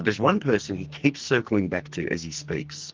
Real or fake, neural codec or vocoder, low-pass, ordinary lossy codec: fake; codec, 44.1 kHz, 2.6 kbps, SNAC; 7.2 kHz; Opus, 32 kbps